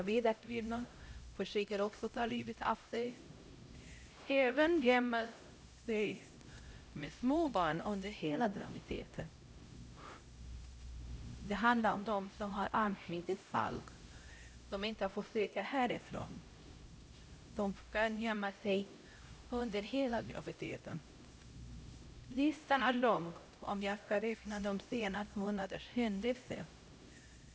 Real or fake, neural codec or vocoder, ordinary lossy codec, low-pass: fake; codec, 16 kHz, 0.5 kbps, X-Codec, HuBERT features, trained on LibriSpeech; none; none